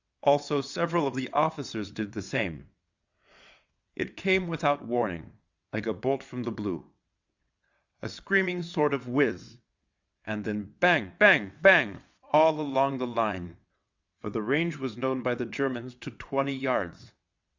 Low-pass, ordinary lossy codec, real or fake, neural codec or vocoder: 7.2 kHz; Opus, 64 kbps; fake; vocoder, 22.05 kHz, 80 mel bands, WaveNeXt